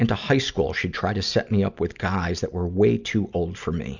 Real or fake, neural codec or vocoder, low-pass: real; none; 7.2 kHz